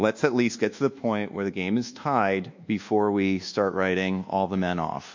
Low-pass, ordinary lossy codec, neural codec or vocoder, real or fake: 7.2 kHz; MP3, 64 kbps; codec, 24 kHz, 1.2 kbps, DualCodec; fake